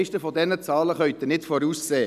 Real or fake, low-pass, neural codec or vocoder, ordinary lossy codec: real; 14.4 kHz; none; none